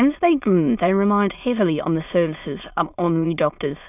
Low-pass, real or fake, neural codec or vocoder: 3.6 kHz; fake; autoencoder, 22.05 kHz, a latent of 192 numbers a frame, VITS, trained on many speakers